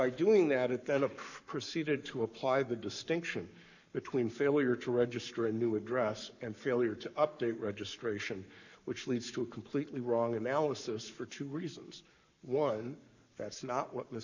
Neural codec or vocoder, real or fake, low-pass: codec, 44.1 kHz, 7.8 kbps, Pupu-Codec; fake; 7.2 kHz